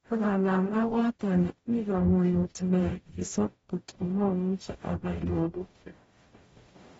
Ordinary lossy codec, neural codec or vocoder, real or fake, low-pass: AAC, 24 kbps; codec, 44.1 kHz, 0.9 kbps, DAC; fake; 19.8 kHz